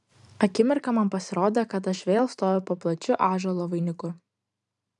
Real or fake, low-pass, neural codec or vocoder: fake; 10.8 kHz; vocoder, 44.1 kHz, 128 mel bands every 512 samples, BigVGAN v2